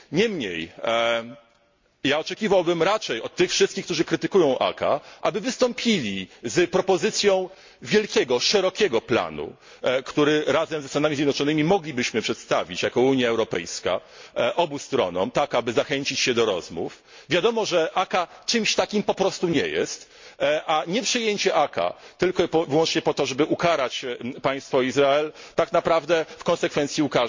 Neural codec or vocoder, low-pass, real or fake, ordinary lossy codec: none; 7.2 kHz; real; MP3, 48 kbps